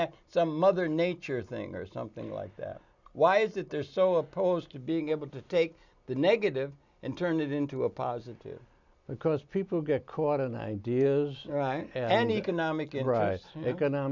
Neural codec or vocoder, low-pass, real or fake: none; 7.2 kHz; real